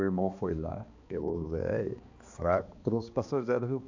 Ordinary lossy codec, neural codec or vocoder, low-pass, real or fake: AAC, 48 kbps; codec, 16 kHz, 2 kbps, X-Codec, HuBERT features, trained on balanced general audio; 7.2 kHz; fake